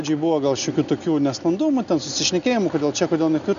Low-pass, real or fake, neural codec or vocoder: 7.2 kHz; real; none